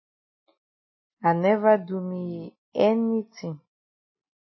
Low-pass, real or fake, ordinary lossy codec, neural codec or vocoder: 7.2 kHz; real; MP3, 24 kbps; none